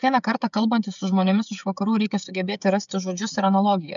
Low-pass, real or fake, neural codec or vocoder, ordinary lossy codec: 7.2 kHz; fake; codec, 16 kHz, 16 kbps, FreqCodec, smaller model; MP3, 96 kbps